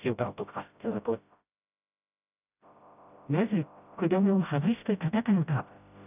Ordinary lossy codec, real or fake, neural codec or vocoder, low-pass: none; fake; codec, 16 kHz, 0.5 kbps, FreqCodec, smaller model; 3.6 kHz